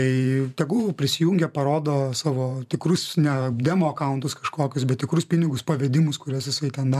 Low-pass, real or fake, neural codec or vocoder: 14.4 kHz; fake; vocoder, 44.1 kHz, 128 mel bands every 512 samples, BigVGAN v2